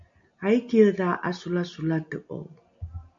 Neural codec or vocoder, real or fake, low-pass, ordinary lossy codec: none; real; 7.2 kHz; MP3, 48 kbps